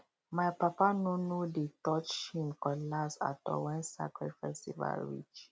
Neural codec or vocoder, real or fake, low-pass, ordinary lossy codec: none; real; none; none